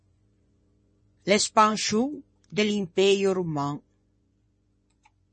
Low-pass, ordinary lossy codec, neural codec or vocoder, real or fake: 10.8 kHz; MP3, 32 kbps; vocoder, 24 kHz, 100 mel bands, Vocos; fake